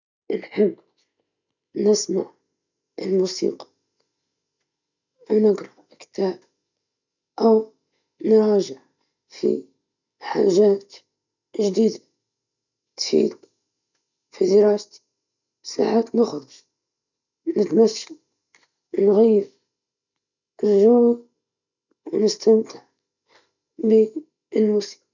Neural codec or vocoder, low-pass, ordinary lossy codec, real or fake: none; 7.2 kHz; none; real